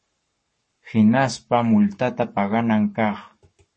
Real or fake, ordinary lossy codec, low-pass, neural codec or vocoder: fake; MP3, 32 kbps; 10.8 kHz; codec, 44.1 kHz, 7.8 kbps, Pupu-Codec